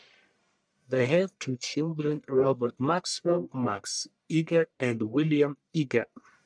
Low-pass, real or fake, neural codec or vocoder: 9.9 kHz; fake; codec, 44.1 kHz, 1.7 kbps, Pupu-Codec